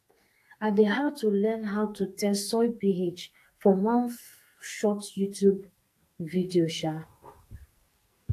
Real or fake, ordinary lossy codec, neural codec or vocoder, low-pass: fake; AAC, 64 kbps; codec, 44.1 kHz, 2.6 kbps, SNAC; 14.4 kHz